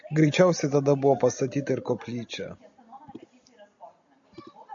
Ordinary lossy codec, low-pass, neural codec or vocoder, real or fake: AAC, 64 kbps; 7.2 kHz; none; real